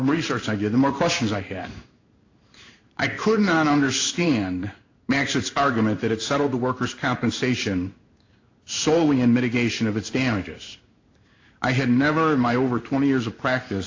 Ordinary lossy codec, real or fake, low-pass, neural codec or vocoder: AAC, 48 kbps; fake; 7.2 kHz; codec, 16 kHz in and 24 kHz out, 1 kbps, XY-Tokenizer